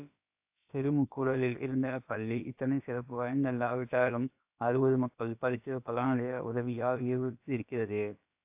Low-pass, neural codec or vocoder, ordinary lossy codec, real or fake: 3.6 kHz; codec, 16 kHz, about 1 kbps, DyCAST, with the encoder's durations; AAC, 32 kbps; fake